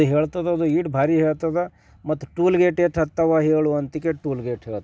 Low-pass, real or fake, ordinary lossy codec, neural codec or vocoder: none; real; none; none